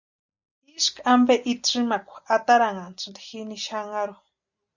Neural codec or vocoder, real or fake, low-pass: none; real; 7.2 kHz